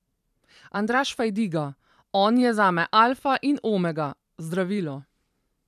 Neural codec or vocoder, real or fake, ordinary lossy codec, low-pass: vocoder, 44.1 kHz, 128 mel bands every 512 samples, BigVGAN v2; fake; none; 14.4 kHz